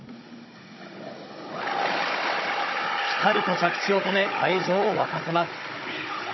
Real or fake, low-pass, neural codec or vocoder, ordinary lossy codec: fake; 7.2 kHz; codec, 16 kHz, 16 kbps, FunCodec, trained on Chinese and English, 50 frames a second; MP3, 24 kbps